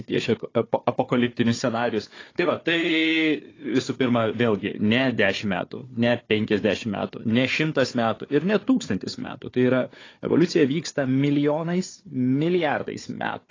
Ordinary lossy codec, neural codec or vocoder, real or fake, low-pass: AAC, 32 kbps; codec, 16 kHz, 4 kbps, FunCodec, trained on Chinese and English, 50 frames a second; fake; 7.2 kHz